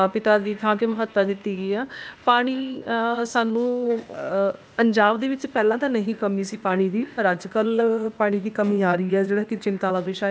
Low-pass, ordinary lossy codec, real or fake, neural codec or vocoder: none; none; fake; codec, 16 kHz, 0.8 kbps, ZipCodec